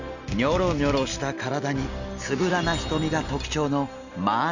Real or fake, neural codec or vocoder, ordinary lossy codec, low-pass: real; none; none; 7.2 kHz